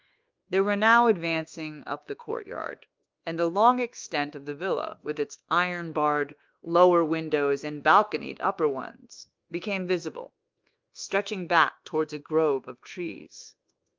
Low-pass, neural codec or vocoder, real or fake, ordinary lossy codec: 7.2 kHz; codec, 24 kHz, 1.2 kbps, DualCodec; fake; Opus, 32 kbps